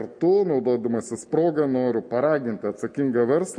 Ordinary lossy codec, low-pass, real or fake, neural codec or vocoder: MP3, 48 kbps; 9.9 kHz; real; none